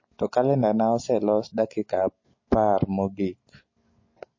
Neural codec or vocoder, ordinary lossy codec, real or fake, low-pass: none; MP3, 32 kbps; real; 7.2 kHz